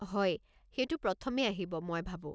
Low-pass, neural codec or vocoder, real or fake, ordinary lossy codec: none; none; real; none